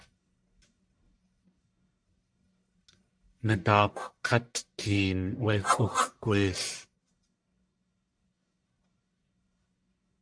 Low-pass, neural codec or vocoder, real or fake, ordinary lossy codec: 9.9 kHz; codec, 44.1 kHz, 1.7 kbps, Pupu-Codec; fake; MP3, 64 kbps